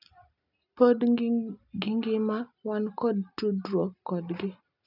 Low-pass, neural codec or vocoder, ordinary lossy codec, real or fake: 5.4 kHz; none; none; real